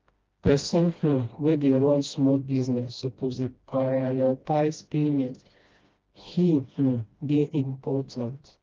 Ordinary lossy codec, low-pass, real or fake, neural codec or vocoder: Opus, 24 kbps; 7.2 kHz; fake; codec, 16 kHz, 1 kbps, FreqCodec, smaller model